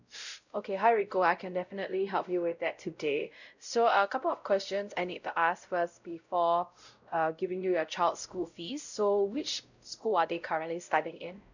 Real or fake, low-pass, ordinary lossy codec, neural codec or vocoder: fake; 7.2 kHz; none; codec, 16 kHz, 0.5 kbps, X-Codec, WavLM features, trained on Multilingual LibriSpeech